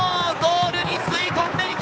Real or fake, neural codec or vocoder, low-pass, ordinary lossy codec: fake; codec, 16 kHz, 4 kbps, X-Codec, HuBERT features, trained on balanced general audio; none; none